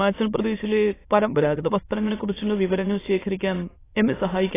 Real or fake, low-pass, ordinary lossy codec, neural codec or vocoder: fake; 3.6 kHz; AAC, 16 kbps; autoencoder, 22.05 kHz, a latent of 192 numbers a frame, VITS, trained on many speakers